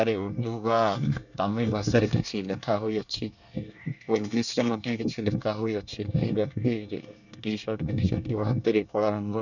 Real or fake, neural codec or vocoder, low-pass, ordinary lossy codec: fake; codec, 24 kHz, 1 kbps, SNAC; 7.2 kHz; none